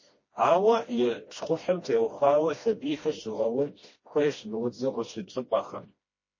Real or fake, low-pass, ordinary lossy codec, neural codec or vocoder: fake; 7.2 kHz; MP3, 32 kbps; codec, 16 kHz, 1 kbps, FreqCodec, smaller model